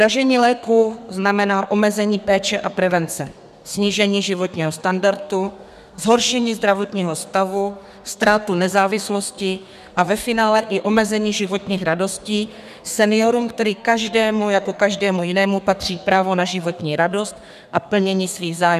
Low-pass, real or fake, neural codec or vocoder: 14.4 kHz; fake; codec, 32 kHz, 1.9 kbps, SNAC